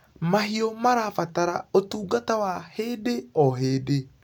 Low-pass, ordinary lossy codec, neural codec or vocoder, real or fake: none; none; none; real